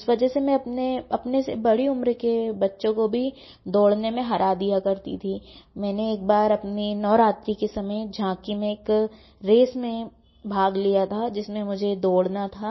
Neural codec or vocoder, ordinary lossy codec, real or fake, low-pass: none; MP3, 24 kbps; real; 7.2 kHz